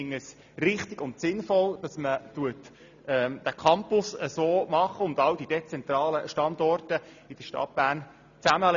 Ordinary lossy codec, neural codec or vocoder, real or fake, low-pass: none; none; real; 7.2 kHz